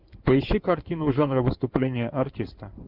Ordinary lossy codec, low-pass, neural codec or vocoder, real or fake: Opus, 32 kbps; 5.4 kHz; codec, 16 kHz in and 24 kHz out, 2.2 kbps, FireRedTTS-2 codec; fake